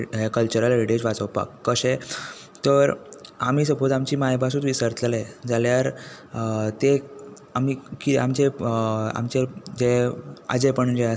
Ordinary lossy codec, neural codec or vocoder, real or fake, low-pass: none; none; real; none